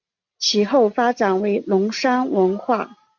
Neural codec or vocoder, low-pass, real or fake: none; 7.2 kHz; real